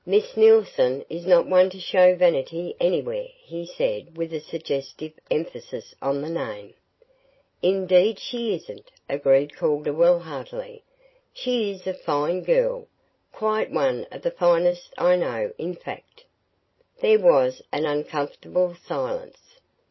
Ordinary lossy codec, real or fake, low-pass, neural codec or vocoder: MP3, 24 kbps; real; 7.2 kHz; none